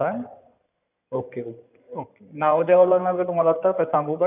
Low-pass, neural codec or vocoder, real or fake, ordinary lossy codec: 3.6 kHz; codec, 16 kHz in and 24 kHz out, 2.2 kbps, FireRedTTS-2 codec; fake; none